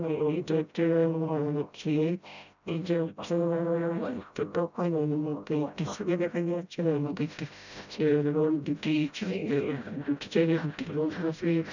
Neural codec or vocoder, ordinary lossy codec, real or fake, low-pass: codec, 16 kHz, 0.5 kbps, FreqCodec, smaller model; none; fake; 7.2 kHz